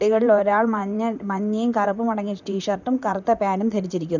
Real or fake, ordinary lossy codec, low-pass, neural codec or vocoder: fake; none; 7.2 kHz; vocoder, 44.1 kHz, 128 mel bands, Pupu-Vocoder